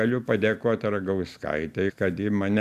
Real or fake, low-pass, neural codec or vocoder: real; 14.4 kHz; none